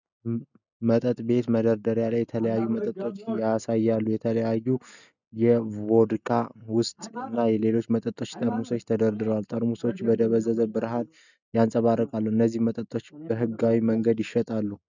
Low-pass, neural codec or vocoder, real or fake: 7.2 kHz; none; real